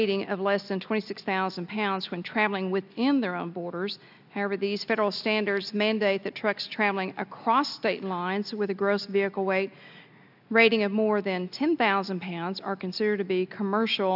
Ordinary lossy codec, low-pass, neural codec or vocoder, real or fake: AAC, 48 kbps; 5.4 kHz; none; real